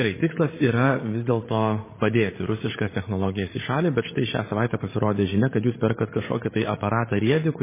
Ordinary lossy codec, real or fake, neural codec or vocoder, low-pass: MP3, 16 kbps; fake; codec, 16 kHz, 16 kbps, FreqCodec, larger model; 3.6 kHz